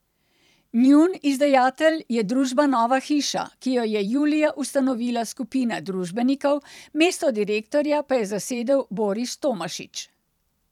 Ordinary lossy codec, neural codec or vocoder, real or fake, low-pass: none; vocoder, 44.1 kHz, 128 mel bands every 512 samples, BigVGAN v2; fake; 19.8 kHz